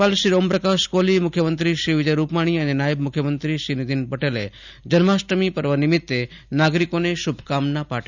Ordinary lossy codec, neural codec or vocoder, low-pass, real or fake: none; none; 7.2 kHz; real